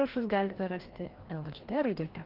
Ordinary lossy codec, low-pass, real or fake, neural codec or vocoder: Opus, 16 kbps; 5.4 kHz; fake; codec, 16 kHz, 1 kbps, FreqCodec, larger model